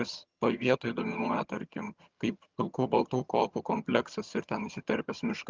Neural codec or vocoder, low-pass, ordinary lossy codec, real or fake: vocoder, 22.05 kHz, 80 mel bands, HiFi-GAN; 7.2 kHz; Opus, 32 kbps; fake